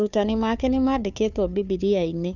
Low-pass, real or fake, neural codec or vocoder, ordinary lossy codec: 7.2 kHz; fake; codec, 16 kHz, 2 kbps, FunCodec, trained on Chinese and English, 25 frames a second; none